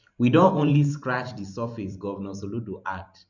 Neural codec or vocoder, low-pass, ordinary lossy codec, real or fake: vocoder, 44.1 kHz, 128 mel bands every 512 samples, BigVGAN v2; 7.2 kHz; none; fake